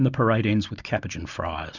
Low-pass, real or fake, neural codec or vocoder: 7.2 kHz; real; none